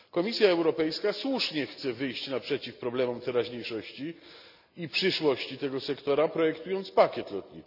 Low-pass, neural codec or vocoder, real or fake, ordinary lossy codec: 5.4 kHz; none; real; none